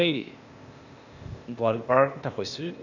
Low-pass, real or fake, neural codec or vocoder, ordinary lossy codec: 7.2 kHz; fake; codec, 16 kHz, 0.8 kbps, ZipCodec; none